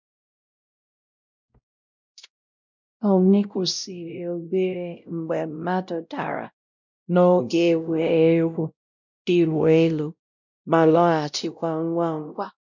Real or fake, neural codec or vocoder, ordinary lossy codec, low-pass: fake; codec, 16 kHz, 0.5 kbps, X-Codec, WavLM features, trained on Multilingual LibriSpeech; none; 7.2 kHz